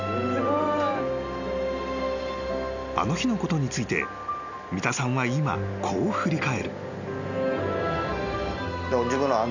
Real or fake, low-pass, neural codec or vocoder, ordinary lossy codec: real; 7.2 kHz; none; none